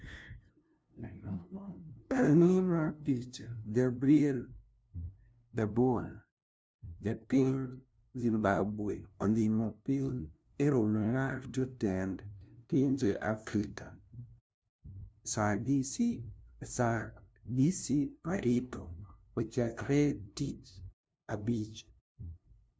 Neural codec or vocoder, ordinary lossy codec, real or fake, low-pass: codec, 16 kHz, 0.5 kbps, FunCodec, trained on LibriTTS, 25 frames a second; none; fake; none